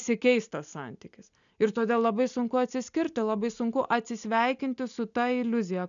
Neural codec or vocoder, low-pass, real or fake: none; 7.2 kHz; real